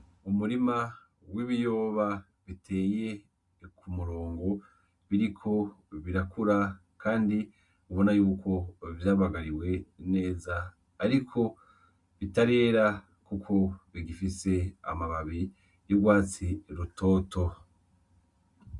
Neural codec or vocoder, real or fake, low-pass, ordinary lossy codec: none; real; 10.8 kHz; Opus, 64 kbps